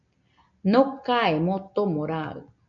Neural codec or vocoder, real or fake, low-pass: none; real; 7.2 kHz